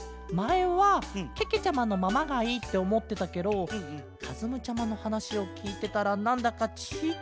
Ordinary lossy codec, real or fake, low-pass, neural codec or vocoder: none; real; none; none